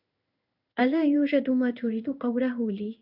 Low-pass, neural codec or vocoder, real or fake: 5.4 kHz; codec, 16 kHz in and 24 kHz out, 1 kbps, XY-Tokenizer; fake